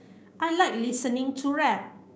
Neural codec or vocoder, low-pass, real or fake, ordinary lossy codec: codec, 16 kHz, 6 kbps, DAC; none; fake; none